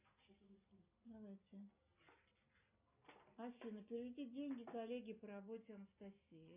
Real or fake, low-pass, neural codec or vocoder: real; 3.6 kHz; none